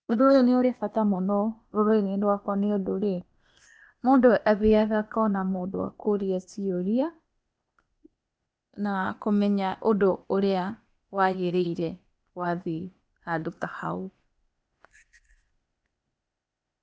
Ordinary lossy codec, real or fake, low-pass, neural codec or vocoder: none; fake; none; codec, 16 kHz, 0.8 kbps, ZipCodec